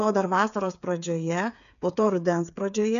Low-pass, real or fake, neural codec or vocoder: 7.2 kHz; fake; codec, 16 kHz, 8 kbps, FreqCodec, smaller model